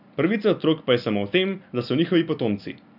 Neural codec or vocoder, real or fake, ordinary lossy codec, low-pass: none; real; none; 5.4 kHz